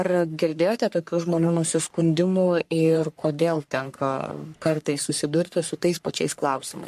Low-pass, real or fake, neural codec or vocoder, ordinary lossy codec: 14.4 kHz; fake; codec, 44.1 kHz, 3.4 kbps, Pupu-Codec; MP3, 64 kbps